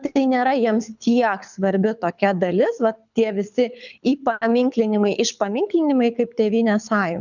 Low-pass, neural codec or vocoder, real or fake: 7.2 kHz; codec, 24 kHz, 6 kbps, HILCodec; fake